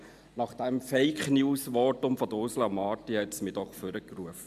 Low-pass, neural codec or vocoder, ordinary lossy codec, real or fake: 14.4 kHz; none; MP3, 96 kbps; real